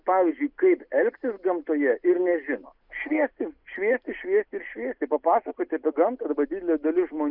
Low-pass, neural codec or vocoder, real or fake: 5.4 kHz; none; real